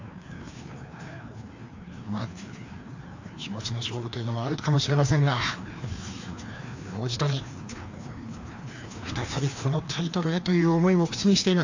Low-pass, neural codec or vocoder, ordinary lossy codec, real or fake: 7.2 kHz; codec, 16 kHz, 2 kbps, FreqCodec, larger model; AAC, 48 kbps; fake